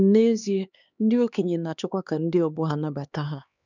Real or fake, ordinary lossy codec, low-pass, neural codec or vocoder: fake; none; 7.2 kHz; codec, 16 kHz, 2 kbps, X-Codec, HuBERT features, trained on LibriSpeech